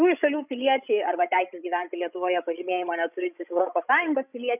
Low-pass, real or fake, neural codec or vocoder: 3.6 kHz; fake; codec, 16 kHz, 16 kbps, FreqCodec, larger model